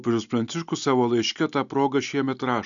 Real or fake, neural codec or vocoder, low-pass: real; none; 7.2 kHz